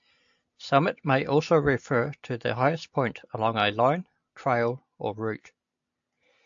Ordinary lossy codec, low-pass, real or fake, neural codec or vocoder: AAC, 64 kbps; 7.2 kHz; real; none